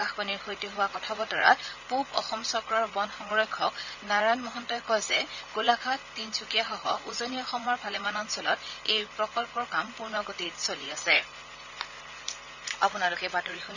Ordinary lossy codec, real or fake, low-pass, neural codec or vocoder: none; fake; 7.2 kHz; vocoder, 44.1 kHz, 80 mel bands, Vocos